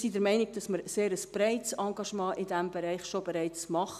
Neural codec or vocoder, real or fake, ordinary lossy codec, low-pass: none; real; none; 14.4 kHz